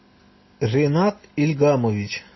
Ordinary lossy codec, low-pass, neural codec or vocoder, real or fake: MP3, 24 kbps; 7.2 kHz; none; real